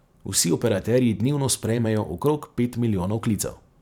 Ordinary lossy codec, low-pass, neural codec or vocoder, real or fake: none; 19.8 kHz; vocoder, 44.1 kHz, 128 mel bands every 512 samples, BigVGAN v2; fake